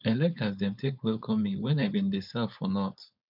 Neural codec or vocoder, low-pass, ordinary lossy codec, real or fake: codec, 16 kHz, 4.8 kbps, FACodec; 5.4 kHz; none; fake